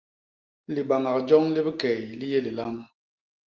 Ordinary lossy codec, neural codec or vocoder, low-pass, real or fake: Opus, 24 kbps; none; 7.2 kHz; real